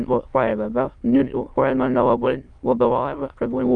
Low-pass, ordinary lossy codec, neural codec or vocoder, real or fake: 9.9 kHz; Opus, 32 kbps; autoencoder, 22.05 kHz, a latent of 192 numbers a frame, VITS, trained on many speakers; fake